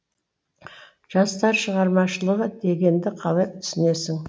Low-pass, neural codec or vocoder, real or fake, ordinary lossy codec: none; none; real; none